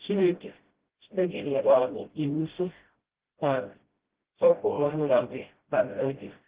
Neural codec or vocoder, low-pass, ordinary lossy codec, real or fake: codec, 16 kHz, 0.5 kbps, FreqCodec, smaller model; 3.6 kHz; Opus, 16 kbps; fake